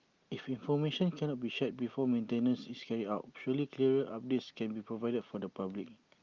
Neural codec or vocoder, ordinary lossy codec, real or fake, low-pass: none; Opus, 32 kbps; real; 7.2 kHz